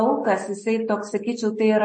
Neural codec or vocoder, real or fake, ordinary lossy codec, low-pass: none; real; MP3, 32 kbps; 9.9 kHz